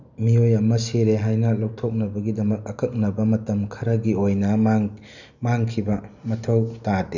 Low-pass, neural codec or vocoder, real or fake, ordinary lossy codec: 7.2 kHz; none; real; none